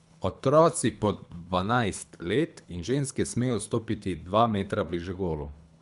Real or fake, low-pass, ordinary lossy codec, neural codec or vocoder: fake; 10.8 kHz; MP3, 96 kbps; codec, 24 kHz, 3 kbps, HILCodec